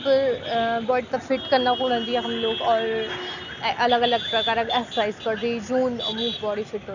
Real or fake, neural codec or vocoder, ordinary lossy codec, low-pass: real; none; none; 7.2 kHz